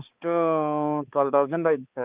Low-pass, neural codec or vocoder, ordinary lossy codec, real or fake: 3.6 kHz; codec, 16 kHz, 4 kbps, X-Codec, HuBERT features, trained on balanced general audio; Opus, 32 kbps; fake